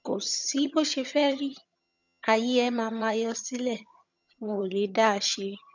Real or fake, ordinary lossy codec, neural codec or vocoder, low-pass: fake; none; vocoder, 22.05 kHz, 80 mel bands, HiFi-GAN; 7.2 kHz